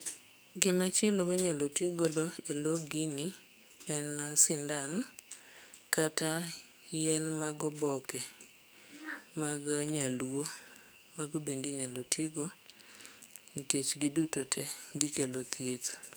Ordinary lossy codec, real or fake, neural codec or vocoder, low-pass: none; fake; codec, 44.1 kHz, 2.6 kbps, SNAC; none